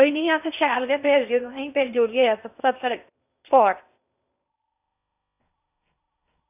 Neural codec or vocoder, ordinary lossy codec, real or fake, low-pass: codec, 16 kHz in and 24 kHz out, 0.8 kbps, FocalCodec, streaming, 65536 codes; none; fake; 3.6 kHz